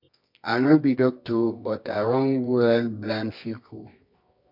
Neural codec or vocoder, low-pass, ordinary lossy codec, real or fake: codec, 24 kHz, 0.9 kbps, WavTokenizer, medium music audio release; 5.4 kHz; MP3, 48 kbps; fake